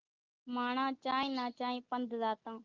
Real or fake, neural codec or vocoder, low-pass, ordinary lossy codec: real; none; 7.2 kHz; Opus, 32 kbps